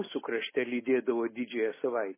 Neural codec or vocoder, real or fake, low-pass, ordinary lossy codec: none; real; 3.6 kHz; MP3, 16 kbps